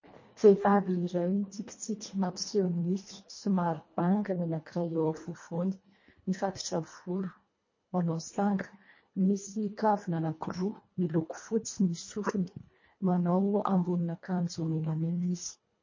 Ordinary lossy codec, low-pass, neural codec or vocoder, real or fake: MP3, 32 kbps; 7.2 kHz; codec, 24 kHz, 1.5 kbps, HILCodec; fake